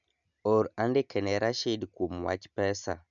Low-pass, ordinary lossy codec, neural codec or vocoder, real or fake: 7.2 kHz; none; none; real